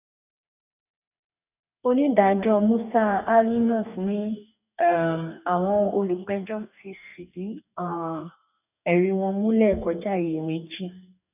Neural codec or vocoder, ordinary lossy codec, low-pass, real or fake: codec, 44.1 kHz, 2.6 kbps, DAC; none; 3.6 kHz; fake